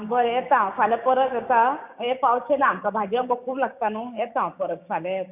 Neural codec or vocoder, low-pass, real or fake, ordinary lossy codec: codec, 16 kHz, 6 kbps, DAC; 3.6 kHz; fake; none